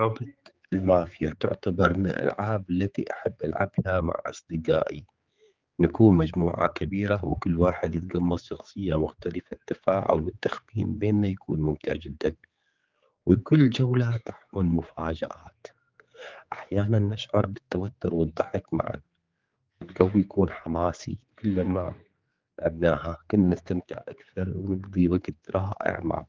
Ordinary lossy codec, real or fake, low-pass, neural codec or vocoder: Opus, 32 kbps; fake; 7.2 kHz; codec, 16 kHz, 4 kbps, X-Codec, HuBERT features, trained on general audio